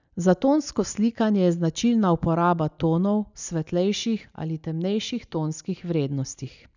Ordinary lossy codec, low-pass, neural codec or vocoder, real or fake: none; 7.2 kHz; none; real